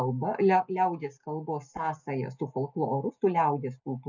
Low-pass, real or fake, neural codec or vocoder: 7.2 kHz; real; none